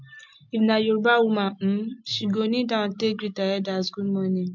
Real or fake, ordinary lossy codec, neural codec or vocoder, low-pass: real; MP3, 64 kbps; none; 7.2 kHz